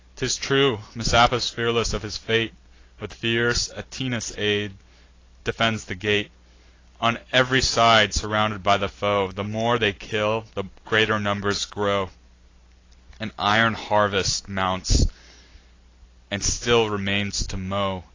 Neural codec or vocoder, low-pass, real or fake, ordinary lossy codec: none; 7.2 kHz; real; AAC, 32 kbps